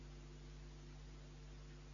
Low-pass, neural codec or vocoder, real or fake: 7.2 kHz; none; real